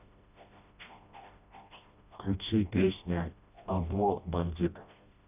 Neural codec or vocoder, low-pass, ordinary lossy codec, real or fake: codec, 16 kHz, 1 kbps, FreqCodec, smaller model; 3.6 kHz; none; fake